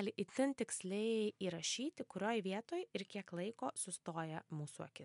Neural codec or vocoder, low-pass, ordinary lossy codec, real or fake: none; 10.8 kHz; MP3, 64 kbps; real